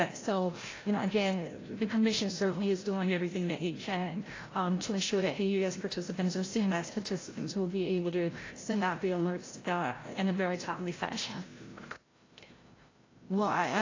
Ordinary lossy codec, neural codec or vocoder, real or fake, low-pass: AAC, 32 kbps; codec, 16 kHz, 0.5 kbps, FreqCodec, larger model; fake; 7.2 kHz